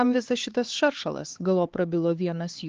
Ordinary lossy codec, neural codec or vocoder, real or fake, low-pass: Opus, 16 kbps; codec, 16 kHz, 4 kbps, X-Codec, HuBERT features, trained on LibriSpeech; fake; 7.2 kHz